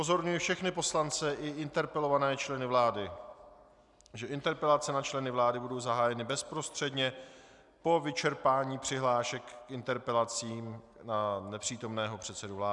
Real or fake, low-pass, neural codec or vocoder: real; 10.8 kHz; none